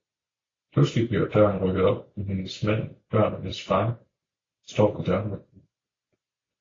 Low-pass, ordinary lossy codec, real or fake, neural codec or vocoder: 7.2 kHz; AAC, 32 kbps; real; none